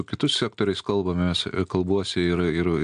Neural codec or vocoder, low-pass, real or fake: none; 9.9 kHz; real